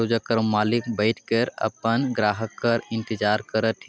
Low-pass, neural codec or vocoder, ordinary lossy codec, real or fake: none; none; none; real